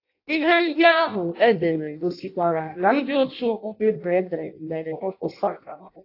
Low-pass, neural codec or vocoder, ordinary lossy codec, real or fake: 5.4 kHz; codec, 16 kHz in and 24 kHz out, 0.6 kbps, FireRedTTS-2 codec; AAC, 32 kbps; fake